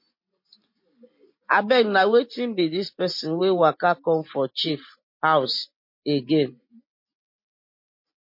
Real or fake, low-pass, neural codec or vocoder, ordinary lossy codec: real; 5.4 kHz; none; MP3, 32 kbps